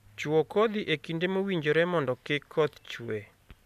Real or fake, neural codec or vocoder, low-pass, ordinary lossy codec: real; none; 14.4 kHz; none